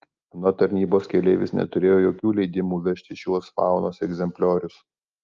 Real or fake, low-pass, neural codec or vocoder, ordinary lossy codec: real; 7.2 kHz; none; Opus, 24 kbps